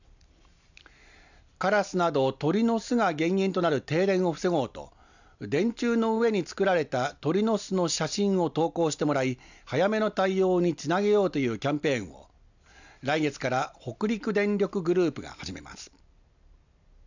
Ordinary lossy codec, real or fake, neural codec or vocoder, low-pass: none; real; none; 7.2 kHz